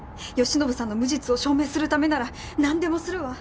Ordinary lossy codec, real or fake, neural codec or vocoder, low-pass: none; real; none; none